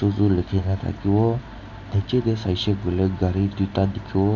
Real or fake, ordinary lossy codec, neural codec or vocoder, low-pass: real; none; none; 7.2 kHz